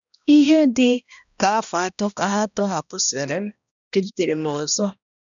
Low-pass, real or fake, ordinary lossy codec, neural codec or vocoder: 7.2 kHz; fake; none; codec, 16 kHz, 1 kbps, X-Codec, HuBERT features, trained on balanced general audio